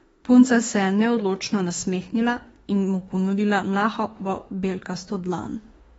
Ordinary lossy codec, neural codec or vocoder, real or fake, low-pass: AAC, 24 kbps; autoencoder, 48 kHz, 32 numbers a frame, DAC-VAE, trained on Japanese speech; fake; 19.8 kHz